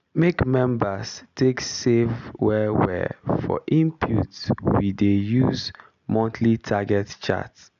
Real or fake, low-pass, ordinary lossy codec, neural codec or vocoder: real; 7.2 kHz; none; none